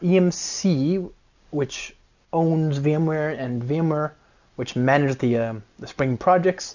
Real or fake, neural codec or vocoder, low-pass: real; none; 7.2 kHz